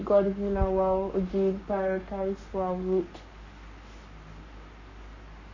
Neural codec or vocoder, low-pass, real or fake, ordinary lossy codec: codec, 44.1 kHz, 7.8 kbps, Pupu-Codec; 7.2 kHz; fake; none